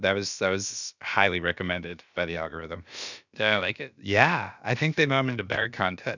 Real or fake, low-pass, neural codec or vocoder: fake; 7.2 kHz; codec, 16 kHz, about 1 kbps, DyCAST, with the encoder's durations